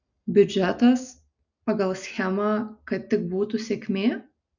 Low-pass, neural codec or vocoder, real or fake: 7.2 kHz; none; real